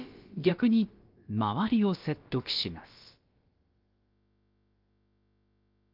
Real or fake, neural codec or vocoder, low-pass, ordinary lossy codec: fake; codec, 16 kHz, about 1 kbps, DyCAST, with the encoder's durations; 5.4 kHz; Opus, 24 kbps